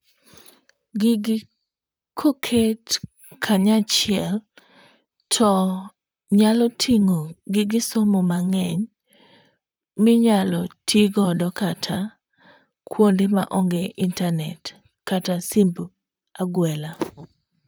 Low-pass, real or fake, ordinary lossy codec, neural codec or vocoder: none; fake; none; vocoder, 44.1 kHz, 128 mel bands, Pupu-Vocoder